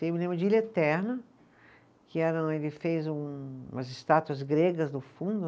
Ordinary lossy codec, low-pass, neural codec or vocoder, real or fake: none; none; none; real